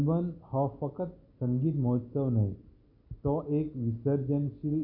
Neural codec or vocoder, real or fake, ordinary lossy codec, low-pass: none; real; none; 5.4 kHz